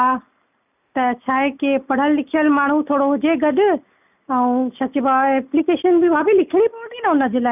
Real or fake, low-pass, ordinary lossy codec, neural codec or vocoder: real; 3.6 kHz; none; none